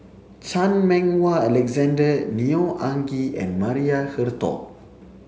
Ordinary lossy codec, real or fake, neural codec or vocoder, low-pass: none; real; none; none